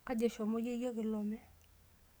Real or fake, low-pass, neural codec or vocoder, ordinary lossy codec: fake; none; codec, 44.1 kHz, 7.8 kbps, DAC; none